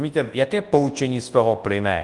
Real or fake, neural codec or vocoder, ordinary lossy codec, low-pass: fake; codec, 24 kHz, 0.9 kbps, WavTokenizer, large speech release; Opus, 24 kbps; 10.8 kHz